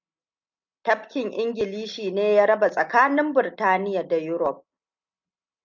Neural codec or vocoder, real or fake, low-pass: none; real; 7.2 kHz